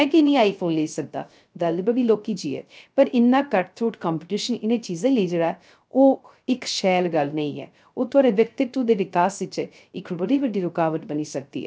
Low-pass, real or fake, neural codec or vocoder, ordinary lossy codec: none; fake; codec, 16 kHz, 0.3 kbps, FocalCodec; none